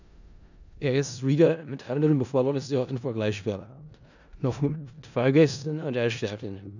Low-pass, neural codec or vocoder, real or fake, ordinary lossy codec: 7.2 kHz; codec, 16 kHz in and 24 kHz out, 0.4 kbps, LongCat-Audio-Codec, four codebook decoder; fake; none